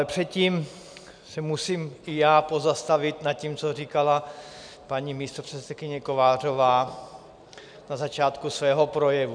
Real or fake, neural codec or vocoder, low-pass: real; none; 9.9 kHz